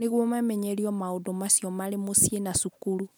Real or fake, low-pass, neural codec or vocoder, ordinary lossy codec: real; none; none; none